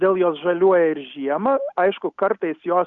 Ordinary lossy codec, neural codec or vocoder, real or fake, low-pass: Opus, 64 kbps; none; real; 7.2 kHz